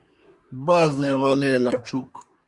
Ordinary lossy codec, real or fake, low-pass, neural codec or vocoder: Opus, 64 kbps; fake; 10.8 kHz; codec, 24 kHz, 1 kbps, SNAC